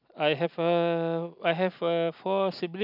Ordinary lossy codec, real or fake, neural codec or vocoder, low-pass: none; real; none; 5.4 kHz